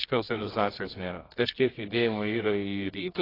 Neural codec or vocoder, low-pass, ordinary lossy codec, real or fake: codec, 24 kHz, 0.9 kbps, WavTokenizer, medium music audio release; 5.4 kHz; AAC, 24 kbps; fake